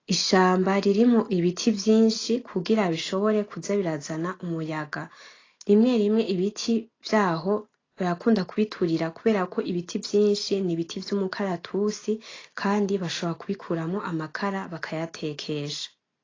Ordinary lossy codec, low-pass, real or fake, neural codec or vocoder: AAC, 32 kbps; 7.2 kHz; real; none